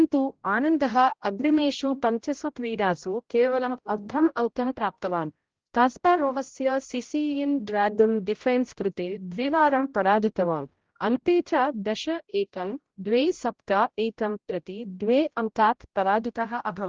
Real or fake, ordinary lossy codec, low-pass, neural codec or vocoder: fake; Opus, 16 kbps; 7.2 kHz; codec, 16 kHz, 0.5 kbps, X-Codec, HuBERT features, trained on general audio